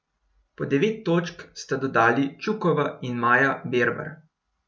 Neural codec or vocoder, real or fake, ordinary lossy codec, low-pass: none; real; none; none